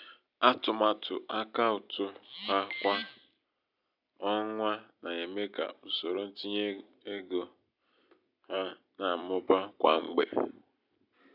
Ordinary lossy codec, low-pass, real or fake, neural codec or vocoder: none; 5.4 kHz; real; none